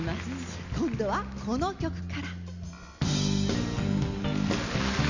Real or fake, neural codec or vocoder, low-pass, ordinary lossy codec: real; none; 7.2 kHz; none